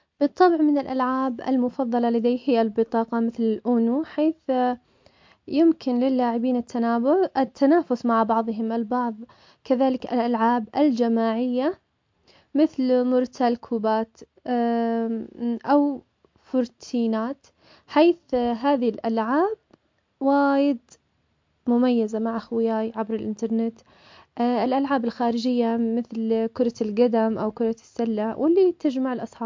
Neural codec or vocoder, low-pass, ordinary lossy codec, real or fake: none; 7.2 kHz; MP3, 48 kbps; real